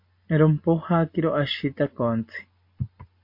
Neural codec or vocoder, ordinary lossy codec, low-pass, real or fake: none; MP3, 32 kbps; 5.4 kHz; real